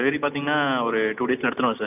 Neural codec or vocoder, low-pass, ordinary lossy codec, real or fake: none; 3.6 kHz; none; real